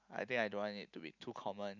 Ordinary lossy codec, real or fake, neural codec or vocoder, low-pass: none; real; none; 7.2 kHz